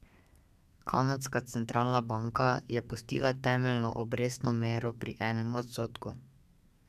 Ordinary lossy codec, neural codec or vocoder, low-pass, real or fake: none; codec, 32 kHz, 1.9 kbps, SNAC; 14.4 kHz; fake